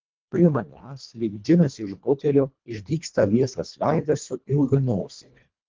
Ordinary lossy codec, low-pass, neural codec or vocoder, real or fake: Opus, 32 kbps; 7.2 kHz; codec, 24 kHz, 1.5 kbps, HILCodec; fake